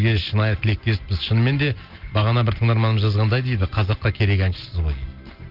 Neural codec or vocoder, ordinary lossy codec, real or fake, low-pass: none; Opus, 32 kbps; real; 5.4 kHz